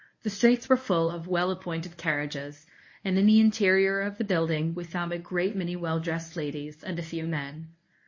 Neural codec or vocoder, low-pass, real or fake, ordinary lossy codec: codec, 24 kHz, 0.9 kbps, WavTokenizer, medium speech release version 1; 7.2 kHz; fake; MP3, 32 kbps